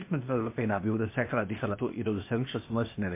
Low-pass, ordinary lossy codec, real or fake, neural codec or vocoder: 3.6 kHz; MP3, 24 kbps; fake; codec, 16 kHz, 0.8 kbps, ZipCodec